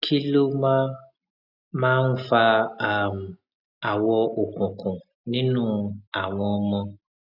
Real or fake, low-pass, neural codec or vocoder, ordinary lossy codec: real; 5.4 kHz; none; none